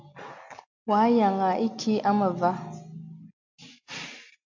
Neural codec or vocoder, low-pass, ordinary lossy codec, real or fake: none; 7.2 kHz; MP3, 64 kbps; real